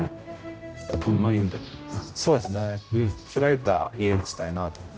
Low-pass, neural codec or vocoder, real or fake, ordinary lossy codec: none; codec, 16 kHz, 0.5 kbps, X-Codec, HuBERT features, trained on general audio; fake; none